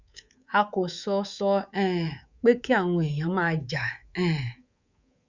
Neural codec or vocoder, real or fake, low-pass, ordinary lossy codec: codec, 24 kHz, 3.1 kbps, DualCodec; fake; 7.2 kHz; Opus, 64 kbps